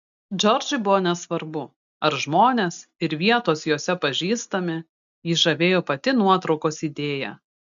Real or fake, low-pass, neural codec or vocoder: real; 7.2 kHz; none